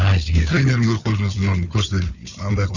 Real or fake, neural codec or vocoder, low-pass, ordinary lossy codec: fake; codec, 16 kHz, 4 kbps, FunCodec, trained on Chinese and English, 50 frames a second; 7.2 kHz; none